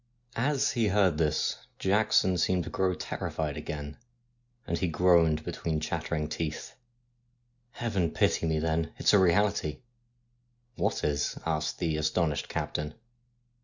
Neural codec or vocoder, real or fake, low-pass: none; real; 7.2 kHz